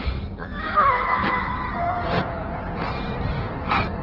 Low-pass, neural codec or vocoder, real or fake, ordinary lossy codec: 5.4 kHz; codec, 16 kHz in and 24 kHz out, 1.1 kbps, FireRedTTS-2 codec; fake; Opus, 16 kbps